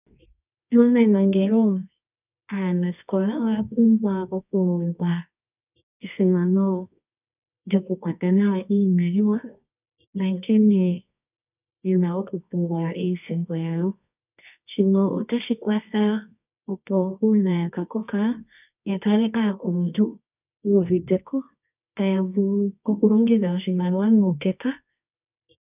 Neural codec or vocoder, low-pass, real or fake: codec, 24 kHz, 0.9 kbps, WavTokenizer, medium music audio release; 3.6 kHz; fake